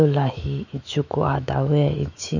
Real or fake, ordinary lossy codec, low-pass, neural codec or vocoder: fake; none; 7.2 kHz; autoencoder, 48 kHz, 128 numbers a frame, DAC-VAE, trained on Japanese speech